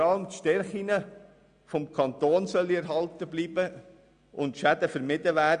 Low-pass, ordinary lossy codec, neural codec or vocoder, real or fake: 9.9 kHz; AAC, 96 kbps; none; real